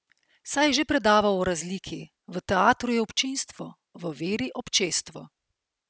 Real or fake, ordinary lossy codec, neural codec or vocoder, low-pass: real; none; none; none